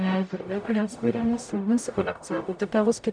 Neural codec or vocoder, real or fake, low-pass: codec, 44.1 kHz, 0.9 kbps, DAC; fake; 9.9 kHz